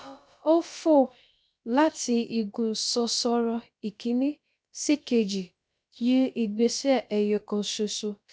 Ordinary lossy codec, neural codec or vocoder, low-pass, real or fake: none; codec, 16 kHz, about 1 kbps, DyCAST, with the encoder's durations; none; fake